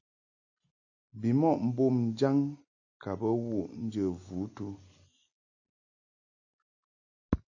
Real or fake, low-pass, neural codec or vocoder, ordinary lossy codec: real; 7.2 kHz; none; AAC, 48 kbps